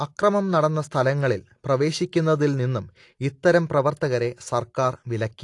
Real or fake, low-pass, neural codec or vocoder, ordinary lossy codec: real; 10.8 kHz; none; AAC, 48 kbps